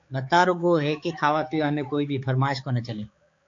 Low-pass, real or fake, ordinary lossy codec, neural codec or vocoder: 7.2 kHz; fake; AAC, 48 kbps; codec, 16 kHz, 4 kbps, X-Codec, HuBERT features, trained on balanced general audio